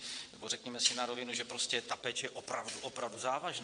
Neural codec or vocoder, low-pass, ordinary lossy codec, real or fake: vocoder, 22.05 kHz, 80 mel bands, WaveNeXt; 9.9 kHz; MP3, 64 kbps; fake